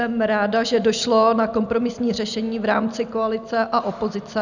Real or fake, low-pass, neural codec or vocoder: real; 7.2 kHz; none